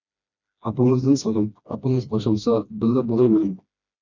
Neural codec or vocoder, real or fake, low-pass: codec, 16 kHz, 1 kbps, FreqCodec, smaller model; fake; 7.2 kHz